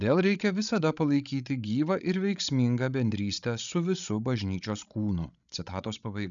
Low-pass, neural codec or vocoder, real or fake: 7.2 kHz; codec, 16 kHz, 16 kbps, FreqCodec, larger model; fake